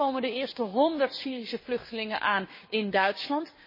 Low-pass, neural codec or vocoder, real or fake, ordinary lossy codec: 5.4 kHz; codec, 16 kHz, 6 kbps, DAC; fake; MP3, 24 kbps